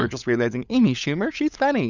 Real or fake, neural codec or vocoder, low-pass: fake; codec, 16 kHz, 16 kbps, FunCodec, trained on LibriTTS, 50 frames a second; 7.2 kHz